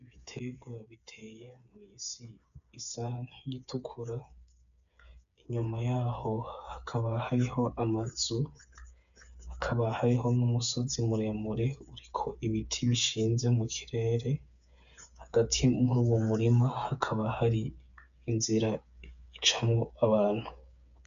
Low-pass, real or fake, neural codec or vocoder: 7.2 kHz; fake; codec, 16 kHz, 8 kbps, FreqCodec, smaller model